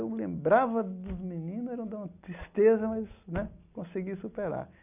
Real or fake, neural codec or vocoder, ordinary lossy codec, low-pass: real; none; none; 3.6 kHz